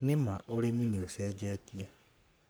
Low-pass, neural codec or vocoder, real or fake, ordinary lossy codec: none; codec, 44.1 kHz, 3.4 kbps, Pupu-Codec; fake; none